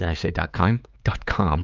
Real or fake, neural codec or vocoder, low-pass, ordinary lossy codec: fake; codec, 16 kHz, 4 kbps, X-Codec, HuBERT features, trained on LibriSpeech; 7.2 kHz; Opus, 24 kbps